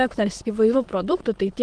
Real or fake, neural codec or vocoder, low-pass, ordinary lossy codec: fake; autoencoder, 22.05 kHz, a latent of 192 numbers a frame, VITS, trained on many speakers; 9.9 kHz; Opus, 16 kbps